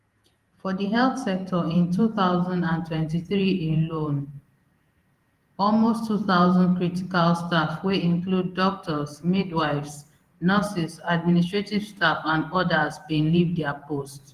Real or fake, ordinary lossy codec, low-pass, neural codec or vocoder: fake; Opus, 24 kbps; 14.4 kHz; vocoder, 48 kHz, 128 mel bands, Vocos